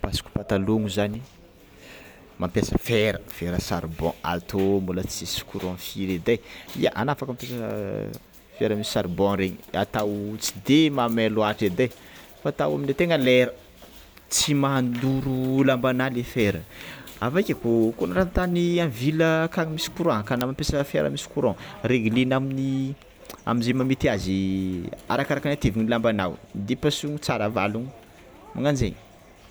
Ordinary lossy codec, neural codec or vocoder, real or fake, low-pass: none; none; real; none